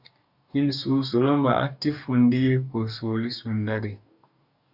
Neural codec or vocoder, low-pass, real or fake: codec, 44.1 kHz, 2.6 kbps, DAC; 5.4 kHz; fake